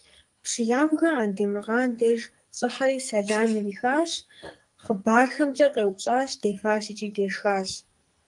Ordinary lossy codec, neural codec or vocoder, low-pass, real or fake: Opus, 32 kbps; codec, 44.1 kHz, 2.6 kbps, SNAC; 10.8 kHz; fake